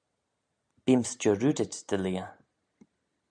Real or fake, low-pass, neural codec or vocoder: real; 9.9 kHz; none